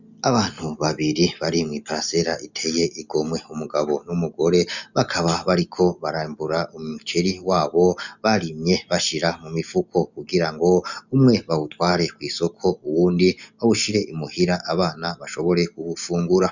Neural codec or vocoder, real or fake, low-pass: none; real; 7.2 kHz